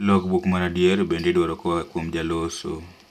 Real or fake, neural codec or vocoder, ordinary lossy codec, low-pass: real; none; none; 14.4 kHz